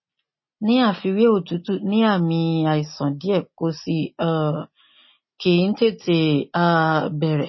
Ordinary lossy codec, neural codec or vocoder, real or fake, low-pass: MP3, 24 kbps; none; real; 7.2 kHz